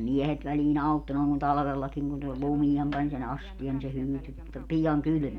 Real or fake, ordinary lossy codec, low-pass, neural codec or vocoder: real; none; 19.8 kHz; none